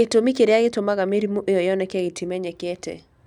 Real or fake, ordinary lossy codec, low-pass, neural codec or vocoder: real; none; 19.8 kHz; none